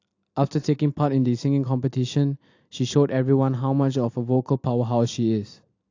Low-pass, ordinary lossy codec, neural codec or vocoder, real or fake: 7.2 kHz; AAC, 48 kbps; none; real